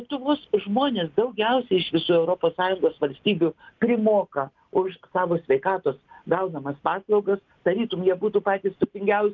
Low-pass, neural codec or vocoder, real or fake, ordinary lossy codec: 7.2 kHz; none; real; Opus, 32 kbps